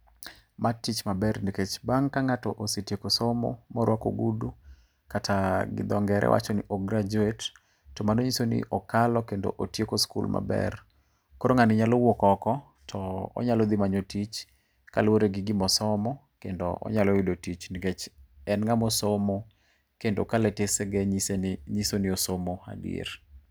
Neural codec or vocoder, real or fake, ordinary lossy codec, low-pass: none; real; none; none